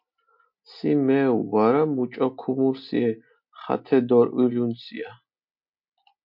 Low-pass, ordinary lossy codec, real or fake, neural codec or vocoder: 5.4 kHz; MP3, 48 kbps; real; none